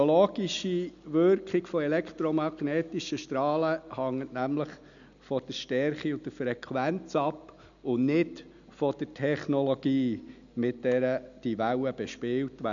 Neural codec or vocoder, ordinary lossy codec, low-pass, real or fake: none; MP3, 96 kbps; 7.2 kHz; real